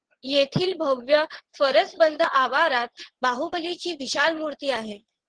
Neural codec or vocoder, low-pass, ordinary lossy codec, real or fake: vocoder, 22.05 kHz, 80 mel bands, WaveNeXt; 9.9 kHz; Opus, 16 kbps; fake